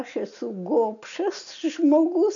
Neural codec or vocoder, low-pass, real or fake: none; 7.2 kHz; real